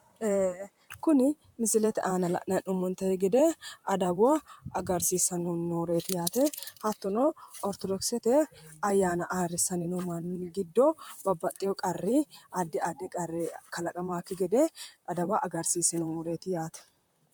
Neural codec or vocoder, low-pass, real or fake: vocoder, 44.1 kHz, 128 mel bands, Pupu-Vocoder; 19.8 kHz; fake